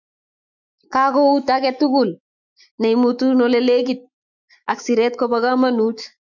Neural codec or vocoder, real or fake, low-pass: autoencoder, 48 kHz, 128 numbers a frame, DAC-VAE, trained on Japanese speech; fake; 7.2 kHz